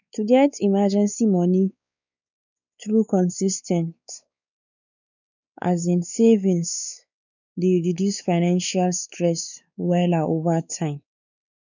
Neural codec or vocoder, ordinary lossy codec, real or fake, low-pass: codec, 16 kHz, 4 kbps, X-Codec, WavLM features, trained on Multilingual LibriSpeech; none; fake; 7.2 kHz